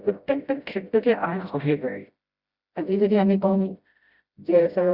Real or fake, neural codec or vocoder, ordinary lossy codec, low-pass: fake; codec, 16 kHz, 0.5 kbps, FreqCodec, smaller model; Opus, 64 kbps; 5.4 kHz